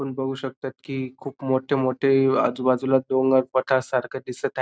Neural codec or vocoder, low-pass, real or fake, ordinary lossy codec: none; none; real; none